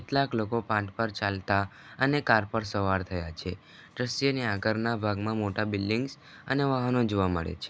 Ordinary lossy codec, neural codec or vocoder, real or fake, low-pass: none; none; real; none